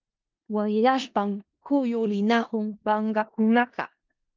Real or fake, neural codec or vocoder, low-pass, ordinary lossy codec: fake; codec, 16 kHz in and 24 kHz out, 0.4 kbps, LongCat-Audio-Codec, four codebook decoder; 7.2 kHz; Opus, 32 kbps